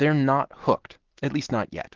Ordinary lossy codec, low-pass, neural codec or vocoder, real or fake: Opus, 16 kbps; 7.2 kHz; none; real